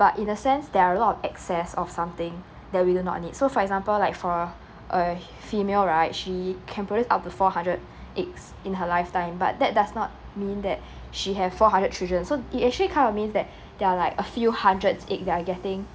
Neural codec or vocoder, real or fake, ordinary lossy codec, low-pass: none; real; none; none